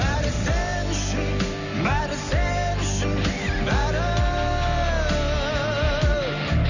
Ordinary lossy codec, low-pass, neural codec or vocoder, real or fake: none; 7.2 kHz; none; real